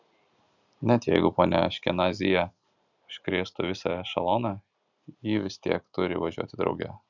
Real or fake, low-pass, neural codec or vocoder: real; 7.2 kHz; none